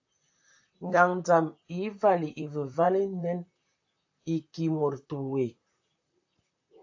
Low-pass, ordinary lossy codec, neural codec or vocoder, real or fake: 7.2 kHz; AAC, 48 kbps; vocoder, 44.1 kHz, 128 mel bands, Pupu-Vocoder; fake